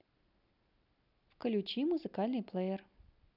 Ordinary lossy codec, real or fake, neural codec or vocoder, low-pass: none; real; none; 5.4 kHz